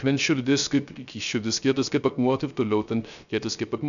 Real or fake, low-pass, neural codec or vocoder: fake; 7.2 kHz; codec, 16 kHz, 0.3 kbps, FocalCodec